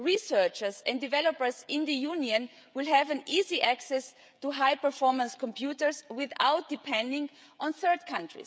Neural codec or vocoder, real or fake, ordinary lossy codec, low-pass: codec, 16 kHz, 16 kbps, FreqCodec, smaller model; fake; none; none